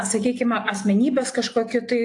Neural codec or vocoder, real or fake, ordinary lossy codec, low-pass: none; real; AAC, 48 kbps; 10.8 kHz